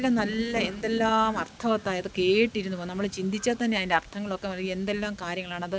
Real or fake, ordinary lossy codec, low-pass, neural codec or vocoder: real; none; none; none